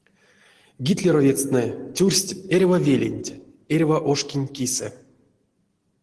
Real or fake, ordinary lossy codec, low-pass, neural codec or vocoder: real; Opus, 16 kbps; 10.8 kHz; none